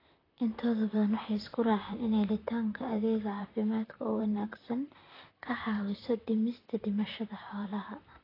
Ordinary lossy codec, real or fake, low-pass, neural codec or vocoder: AAC, 24 kbps; fake; 5.4 kHz; vocoder, 44.1 kHz, 128 mel bands, Pupu-Vocoder